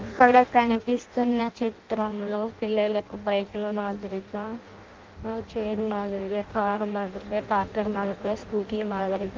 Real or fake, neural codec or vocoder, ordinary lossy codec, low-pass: fake; codec, 16 kHz in and 24 kHz out, 0.6 kbps, FireRedTTS-2 codec; Opus, 32 kbps; 7.2 kHz